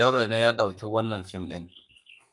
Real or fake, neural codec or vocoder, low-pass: fake; codec, 32 kHz, 1.9 kbps, SNAC; 10.8 kHz